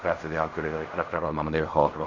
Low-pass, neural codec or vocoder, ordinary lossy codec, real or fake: 7.2 kHz; codec, 16 kHz in and 24 kHz out, 0.4 kbps, LongCat-Audio-Codec, fine tuned four codebook decoder; none; fake